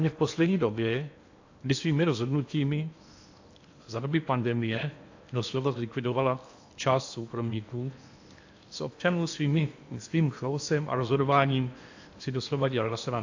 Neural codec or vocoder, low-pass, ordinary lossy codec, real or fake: codec, 16 kHz in and 24 kHz out, 0.8 kbps, FocalCodec, streaming, 65536 codes; 7.2 kHz; AAC, 48 kbps; fake